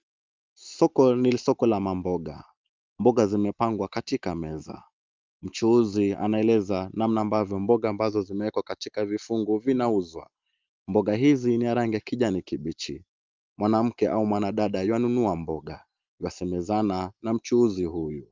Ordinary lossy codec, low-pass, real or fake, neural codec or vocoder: Opus, 24 kbps; 7.2 kHz; real; none